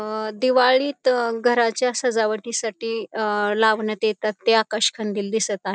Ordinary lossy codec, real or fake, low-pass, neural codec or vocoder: none; real; none; none